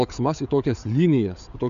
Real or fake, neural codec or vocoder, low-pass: fake; codec, 16 kHz, 4 kbps, FreqCodec, larger model; 7.2 kHz